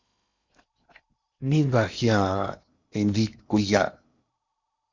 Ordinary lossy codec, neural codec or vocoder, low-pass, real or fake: Opus, 64 kbps; codec, 16 kHz in and 24 kHz out, 0.8 kbps, FocalCodec, streaming, 65536 codes; 7.2 kHz; fake